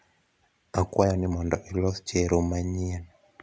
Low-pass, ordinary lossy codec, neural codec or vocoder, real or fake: none; none; none; real